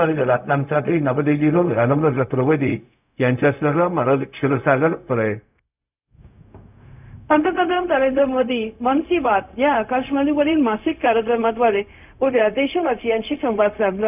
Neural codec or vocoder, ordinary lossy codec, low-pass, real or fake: codec, 16 kHz, 0.4 kbps, LongCat-Audio-Codec; none; 3.6 kHz; fake